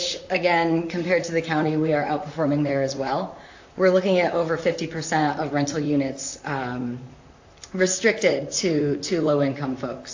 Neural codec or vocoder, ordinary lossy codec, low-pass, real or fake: vocoder, 44.1 kHz, 128 mel bands, Pupu-Vocoder; AAC, 48 kbps; 7.2 kHz; fake